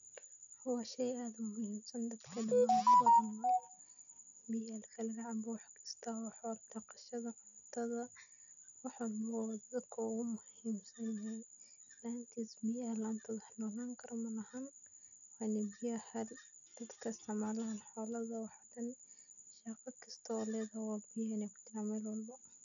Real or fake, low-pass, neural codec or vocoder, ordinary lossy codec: real; 7.2 kHz; none; none